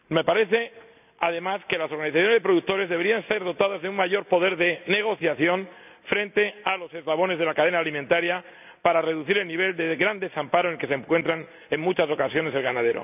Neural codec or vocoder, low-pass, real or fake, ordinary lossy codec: none; 3.6 kHz; real; none